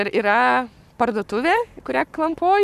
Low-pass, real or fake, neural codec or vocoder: 14.4 kHz; fake; codec, 44.1 kHz, 7.8 kbps, Pupu-Codec